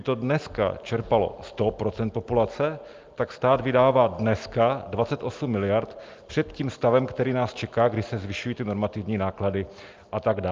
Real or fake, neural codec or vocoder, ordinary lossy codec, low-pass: real; none; Opus, 32 kbps; 7.2 kHz